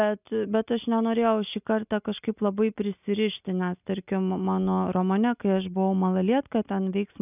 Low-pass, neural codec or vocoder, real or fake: 3.6 kHz; none; real